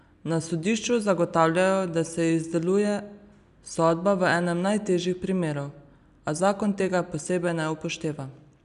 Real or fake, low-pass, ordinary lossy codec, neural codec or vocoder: real; 10.8 kHz; none; none